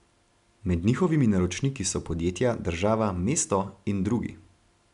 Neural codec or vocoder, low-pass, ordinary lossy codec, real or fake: none; 10.8 kHz; MP3, 96 kbps; real